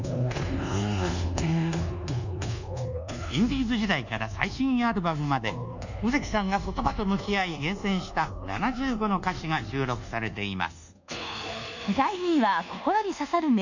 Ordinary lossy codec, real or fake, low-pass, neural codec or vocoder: none; fake; 7.2 kHz; codec, 24 kHz, 1.2 kbps, DualCodec